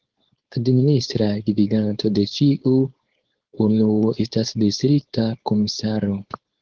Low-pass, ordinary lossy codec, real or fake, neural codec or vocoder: 7.2 kHz; Opus, 16 kbps; fake; codec, 16 kHz, 4.8 kbps, FACodec